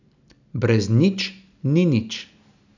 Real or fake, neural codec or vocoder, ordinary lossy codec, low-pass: real; none; none; 7.2 kHz